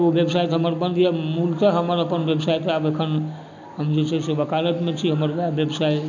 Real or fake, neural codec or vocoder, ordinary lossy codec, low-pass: real; none; none; 7.2 kHz